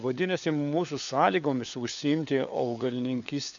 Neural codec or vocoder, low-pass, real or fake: codec, 16 kHz, 4 kbps, FunCodec, trained on LibriTTS, 50 frames a second; 7.2 kHz; fake